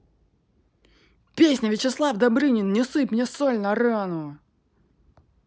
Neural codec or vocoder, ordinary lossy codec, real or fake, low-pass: none; none; real; none